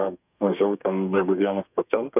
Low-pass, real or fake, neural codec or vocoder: 3.6 kHz; fake; codec, 32 kHz, 1.9 kbps, SNAC